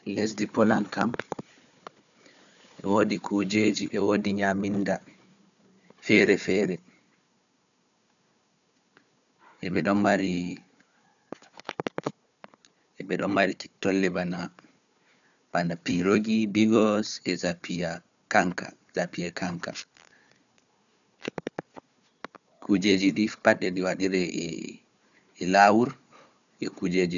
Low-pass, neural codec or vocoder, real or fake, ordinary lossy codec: 7.2 kHz; codec, 16 kHz, 4 kbps, FunCodec, trained on Chinese and English, 50 frames a second; fake; none